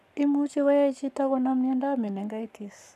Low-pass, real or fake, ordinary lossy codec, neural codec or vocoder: 14.4 kHz; fake; none; codec, 44.1 kHz, 7.8 kbps, Pupu-Codec